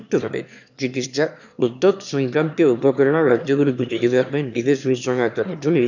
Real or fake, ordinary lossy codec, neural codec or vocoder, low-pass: fake; none; autoencoder, 22.05 kHz, a latent of 192 numbers a frame, VITS, trained on one speaker; 7.2 kHz